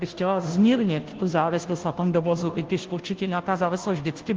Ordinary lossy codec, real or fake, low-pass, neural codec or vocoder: Opus, 16 kbps; fake; 7.2 kHz; codec, 16 kHz, 0.5 kbps, FunCodec, trained on Chinese and English, 25 frames a second